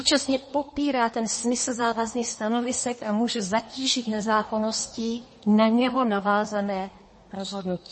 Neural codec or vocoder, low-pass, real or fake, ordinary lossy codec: codec, 24 kHz, 1 kbps, SNAC; 10.8 kHz; fake; MP3, 32 kbps